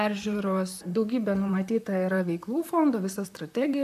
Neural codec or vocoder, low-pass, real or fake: vocoder, 44.1 kHz, 128 mel bands, Pupu-Vocoder; 14.4 kHz; fake